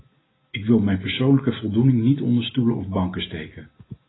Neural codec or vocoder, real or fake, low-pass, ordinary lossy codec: none; real; 7.2 kHz; AAC, 16 kbps